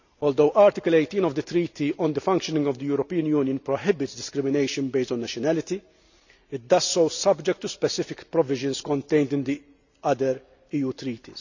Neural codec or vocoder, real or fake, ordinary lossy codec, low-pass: none; real; none; 7.2 kHz